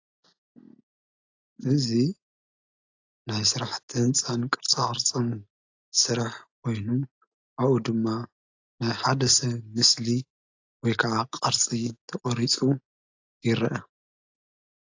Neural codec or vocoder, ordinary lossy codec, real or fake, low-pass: none; AAC, 48 kbps; real; 7.2 kHz